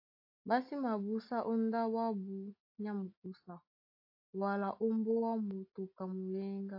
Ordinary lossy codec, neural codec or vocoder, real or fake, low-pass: AAC, 48 kbps; none; real; 5.4 kHz